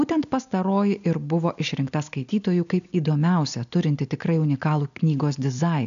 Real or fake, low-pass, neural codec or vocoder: real; 7.2 kHz; none